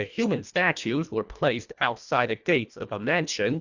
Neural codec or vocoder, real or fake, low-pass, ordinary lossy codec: codec, 24 kHz, 1.5 kbps, HILCodec; fake; 7.2 kHz; Opus, 64 kbps